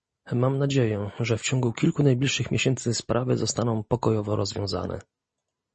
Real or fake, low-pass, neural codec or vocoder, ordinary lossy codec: real; 10.8 kHz; none; MP3, 32 kbps